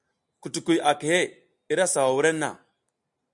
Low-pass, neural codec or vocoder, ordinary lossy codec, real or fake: 10.8 kHz; none; MP3, 96 kbps; real